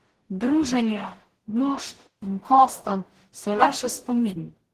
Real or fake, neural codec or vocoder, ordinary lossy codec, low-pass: fake; codec, 44.1 kHz, 0.9 kbps, DAC; Opus, 16 kbps; 14.4 kHz